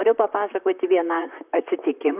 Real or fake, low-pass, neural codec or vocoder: fake; 3.6 kHz; vocoder, 44.1 kHz, 128 mel bands, Pupu-Vocoder